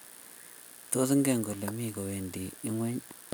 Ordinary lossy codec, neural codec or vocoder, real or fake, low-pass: none; none; real; none